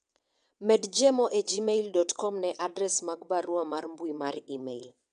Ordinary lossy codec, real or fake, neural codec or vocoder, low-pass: none; fake; vocoder, 22.05 kHz, 80 mel bands, WaveNeXt; 9.9 kHz